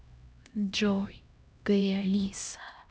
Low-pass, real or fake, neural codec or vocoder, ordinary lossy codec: none; fake; codec, 16 kHz, 1 kbps, X-Codec, HuBERT features, trained on LibriSpeech; none